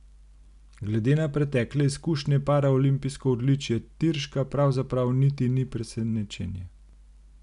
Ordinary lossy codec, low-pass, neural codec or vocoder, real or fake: none; 10.8 kHz; none; real